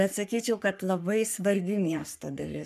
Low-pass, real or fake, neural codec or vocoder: 14.4 kHz; fake; codec, 44.1 kHz, 3.4 kbps, Pupu-Codec